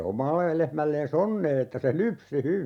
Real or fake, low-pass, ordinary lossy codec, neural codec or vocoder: real; 19.8 kHz; none; none